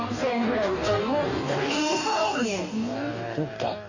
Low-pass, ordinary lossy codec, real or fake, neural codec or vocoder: 7.2 kHz; none; fake; codec, 44.1 kHz, 2.6 kbps, DAC